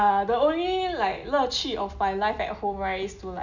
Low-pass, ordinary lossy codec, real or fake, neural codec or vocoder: 7.2 kHz; none; real; none